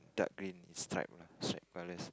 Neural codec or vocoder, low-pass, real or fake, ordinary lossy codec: none; none; real; none